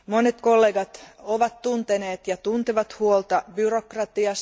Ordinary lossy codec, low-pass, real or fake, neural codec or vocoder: none; none; real; none